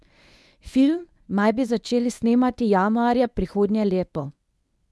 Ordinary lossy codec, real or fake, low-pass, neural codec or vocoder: none; fake; none; codec, 24 kHz, 0.9 kbps, WavTokenizer, medium speech release version 1